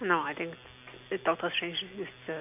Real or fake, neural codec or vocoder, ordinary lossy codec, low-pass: real; none; none; 3.6 kHz